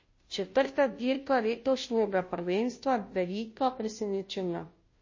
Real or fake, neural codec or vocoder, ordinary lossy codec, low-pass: fake; codec, 16 kHz, 0.5 kbps, FunCodec, trained on Chinese and English, 25 frames a second; MP3, 32 kbps; 7.2 kHz